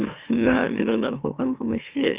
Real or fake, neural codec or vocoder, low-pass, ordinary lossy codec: fake; autoencoder, 44.1 kHz, a latent of 192 numbers a frame, MeloTTS; 3.6 kHz; Opus, 64 kbps